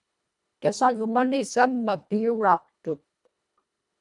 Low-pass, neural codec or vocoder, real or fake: 10.8 kHz; codec, 24 kHz, 1.5 kbps, HILCodec; fake